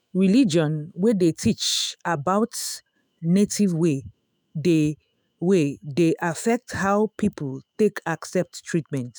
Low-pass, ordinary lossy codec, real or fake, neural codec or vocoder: none; none; fake; autoencoder, 48 kHz, 128 numbers a frame, DAC-VAE, trained on Japanese speech